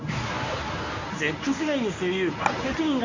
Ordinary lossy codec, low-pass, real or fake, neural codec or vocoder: MP3, 64 kbps; 7.2 kHz; fake; codec, 24 kHz, 0.9 kbps, WavTokenizer, medium speech release version 1